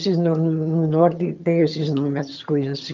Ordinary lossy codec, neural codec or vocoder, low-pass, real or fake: Opus, 24 kbps; vocoder, 22.05 kHz, 80 mel bands, HiFi-GAN; 7.2 kHz; fake